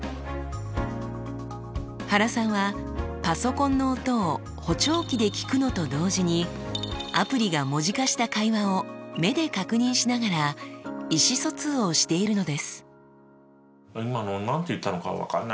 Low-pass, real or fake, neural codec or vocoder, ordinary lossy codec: none; real; none; none